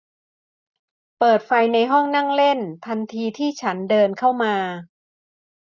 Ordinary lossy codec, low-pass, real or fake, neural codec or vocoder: none; 7.2 kHz; real; none